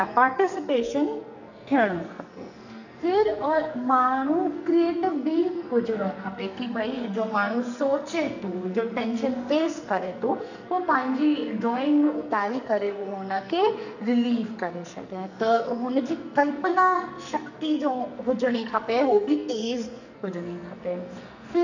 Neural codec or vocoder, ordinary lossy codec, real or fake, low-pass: codec, 44.1 kHz, 2.6 kbps, SNAC; none; fake; 7.2 kHz